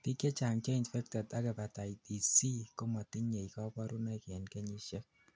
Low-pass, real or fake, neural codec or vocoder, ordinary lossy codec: none; real; none; none